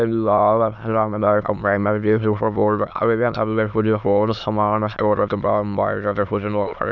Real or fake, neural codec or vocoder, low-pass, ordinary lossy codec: fake; autoencoder, 22.05 kHz, a latent of 192 numbers a frame, VITS, trained on many speakers; 7.2 kHz; none